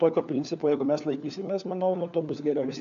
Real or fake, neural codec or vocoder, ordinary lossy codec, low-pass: fake; codec, 16 kHz, 4 kbps, FunCodec, trained on LibriTTS, 50 frames a second; AAC, 64 kbps; 7.2 kHz